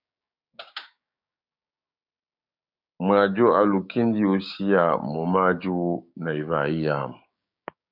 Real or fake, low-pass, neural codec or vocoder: fake; 5.4 kHz; codec, 16 kHz, 6 kbps, DAC